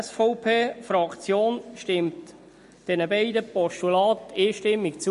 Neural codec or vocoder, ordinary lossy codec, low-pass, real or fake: none; MP3, 48 kbps; 10.8 kHz; real